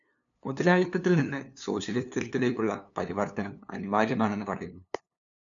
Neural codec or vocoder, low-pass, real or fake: codec, 16 kHz, 2 kbps, FunCodec, trained on LibriTTS, 25 frames a second; 7.2 kHz; fake